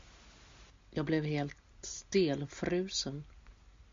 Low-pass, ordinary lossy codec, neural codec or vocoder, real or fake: 7.2 kHz; MP3, 48 kbps; none; real